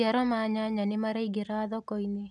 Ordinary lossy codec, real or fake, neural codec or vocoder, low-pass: none; real; none; none